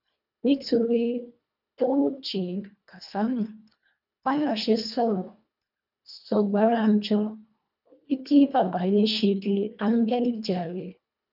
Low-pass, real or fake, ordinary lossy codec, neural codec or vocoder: 5.4 kHz; fake; none; codec, 24 kHz, 1.5 kbps, HILCodec